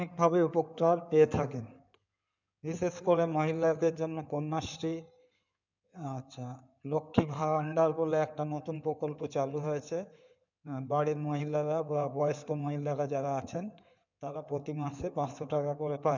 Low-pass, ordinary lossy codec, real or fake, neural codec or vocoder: 7.2 kHz; none; fake; codec, 16 kHz in and 24 kHz out, 2.2 kbps, FireRedTTS-2 codec